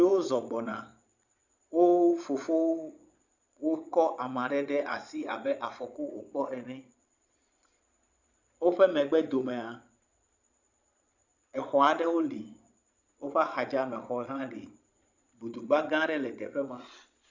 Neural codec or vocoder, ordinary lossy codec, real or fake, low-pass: vocoder, 22.05 kHz, 80 mel bands, Vocos; Opus, 64 kbps; fake; 7.2 kHz